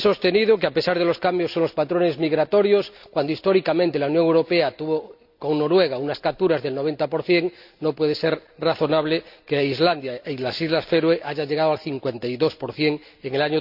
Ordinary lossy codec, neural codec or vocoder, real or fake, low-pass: none; none; real; 5.4 kHz